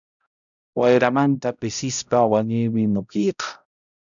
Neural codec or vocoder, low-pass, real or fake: codec, 16 kHz, 0.5 kbps, X-Codec, HuBERT features, trained on balanced general audio; 7.2 kHz; fake